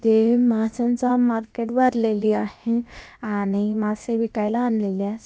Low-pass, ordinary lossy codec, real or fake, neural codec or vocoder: none; none; fake; codec, 16 kHz, about 1 kbps, DyCAST, with the encoder's durations